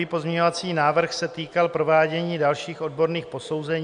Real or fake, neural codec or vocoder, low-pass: real; none; 9.9 kHz